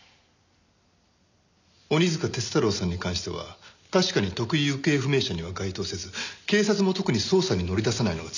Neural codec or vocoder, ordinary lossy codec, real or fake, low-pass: none; none; real; 7.2 kHz